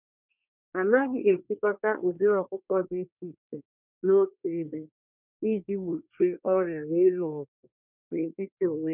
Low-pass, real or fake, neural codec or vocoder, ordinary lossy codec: 3.6 kHz; fake; codec, 24 kHz, 1 kbps, SNAC; none